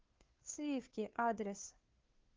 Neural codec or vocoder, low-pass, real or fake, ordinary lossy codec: codec, 16 kHz, 2 kbps, FunCodec, trained on Chinese and English, 25 frames a second; 7.2 kHz; fake; Opus, 16 kbps